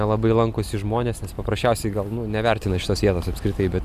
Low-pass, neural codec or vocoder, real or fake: 14.4 kHz; none; real